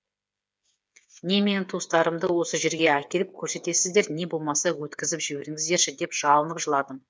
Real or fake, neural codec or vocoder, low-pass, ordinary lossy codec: fake; codec, 16 kHz, 8 kbps, FreqCodec, smaller model; none; none